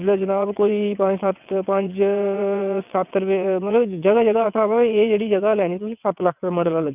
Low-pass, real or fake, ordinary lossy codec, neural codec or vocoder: 3.6 kHz; fake; none; vocoder, 22.05 kHz, 80 mel bands, WaveNeXt